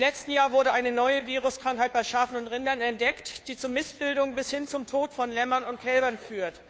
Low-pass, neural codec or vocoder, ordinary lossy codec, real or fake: none; codec, 16 kHz, 2 kbps, FunCodec, trained on Chinese and English, 25 frames a second; none; fake